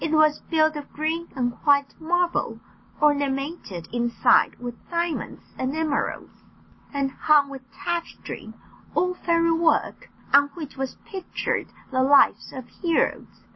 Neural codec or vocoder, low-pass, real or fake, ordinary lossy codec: none; 7.2 kHz; real; MP3, 24 kbps